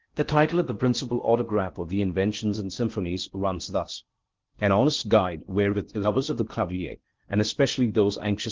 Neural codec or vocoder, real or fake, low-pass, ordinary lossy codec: codec, 16 kHz in and 24 kHz out, 0.6 kbps, FocalCodec, streaming, 4096 codes; fake; 7.2 kHz; Opus, 16 kbps